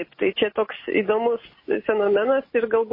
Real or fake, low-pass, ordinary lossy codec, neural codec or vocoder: real; 5.4 kHz; MP3, 24 kbps; none